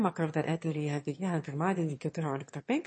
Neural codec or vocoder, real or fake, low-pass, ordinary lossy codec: autoencoder, 22.05 kHz, a latent of 192 numbers a frame, VITS, trained on one speaker; fake; 9.9 kHz; MP3, 32 kbps